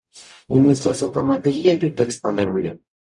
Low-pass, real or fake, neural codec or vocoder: 10.8 kHz; fake; codec, 44.1 kHz, 0.9 kbps, DAC